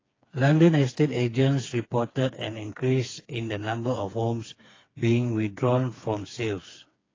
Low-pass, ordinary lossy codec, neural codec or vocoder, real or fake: 7.2 kHz; AAC, 32 kbps; codec, 16 kHz, 4 kbps, FreqCodec, smaller model; fake